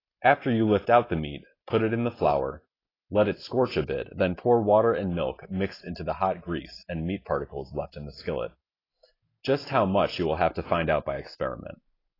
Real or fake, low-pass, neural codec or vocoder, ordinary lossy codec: real; 5.4 kHz; none; AAC, 24 kbps